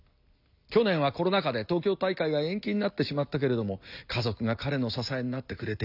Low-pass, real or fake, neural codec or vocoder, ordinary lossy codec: 5.4 kHz; real; none; none